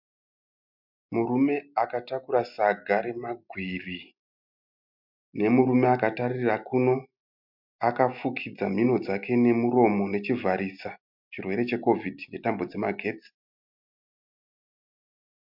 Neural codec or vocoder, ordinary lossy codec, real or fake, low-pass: none; MP3, 48 kbps; real; 5.4 kHz